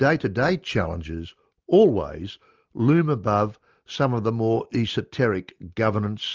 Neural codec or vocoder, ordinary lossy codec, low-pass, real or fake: none; Opus, 24 kbps; 7.2 kHz; real